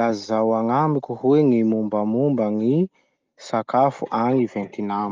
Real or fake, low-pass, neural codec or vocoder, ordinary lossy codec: real; 7.2 kHz; none; Opus, 32 kbps